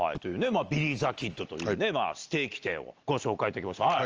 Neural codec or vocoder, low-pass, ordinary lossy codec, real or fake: none; 7.2 kHz; Opus, 24 kbps; real